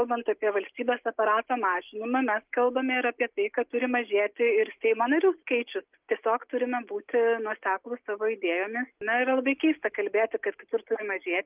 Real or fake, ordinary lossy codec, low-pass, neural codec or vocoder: real; Opus, 24 kbps; 3.6 kHz; none